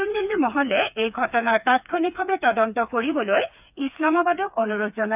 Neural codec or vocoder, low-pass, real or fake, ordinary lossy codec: codec, 16 kHz, 4 kbps, FreqCodec, smaller model; 3.6 kHz; fake; none